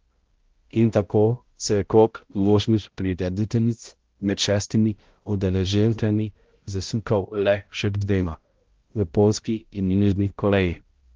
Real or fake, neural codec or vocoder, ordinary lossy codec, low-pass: fake; codec, 16 kHz, 0.5 kbps, X-Codec, HuBERT features, trained on balanced general audio; Opus, 16 kbps; 7.2 kHz